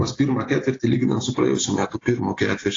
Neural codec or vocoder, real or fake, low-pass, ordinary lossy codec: none; real; 7.2 kHz; AAC, 32 kbps